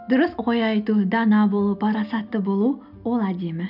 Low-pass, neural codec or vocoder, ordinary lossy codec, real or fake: 5.4 kHz; none; none; real